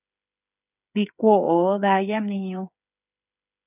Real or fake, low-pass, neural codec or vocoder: fake; 3.6 kHz; codec, 16 kHz, 8 kbps, FreqCodec, smaller model